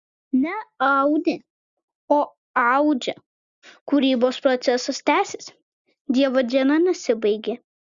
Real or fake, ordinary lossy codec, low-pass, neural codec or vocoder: real; Opus, 64 kbps; 7.2 kHz; none